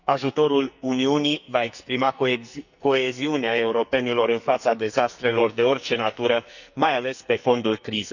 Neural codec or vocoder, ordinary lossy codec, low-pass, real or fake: codec, 44.1 kHz, 2.6 kbps, SNAC; none; 7.2 kHz; fake